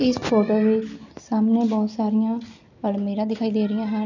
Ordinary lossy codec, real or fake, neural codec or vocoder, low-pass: none; real; none; 7.2 kHz